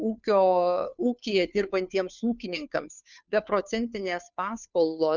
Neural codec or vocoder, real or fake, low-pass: codec, 16 kHz, 2 kbps, FunCodec, trained on Chinese and English, 25 frames a second; fake; 7.2 kHz